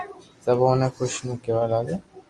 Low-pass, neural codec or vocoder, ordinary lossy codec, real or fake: 10.8 kHz; none; Opus, 32 kbps; real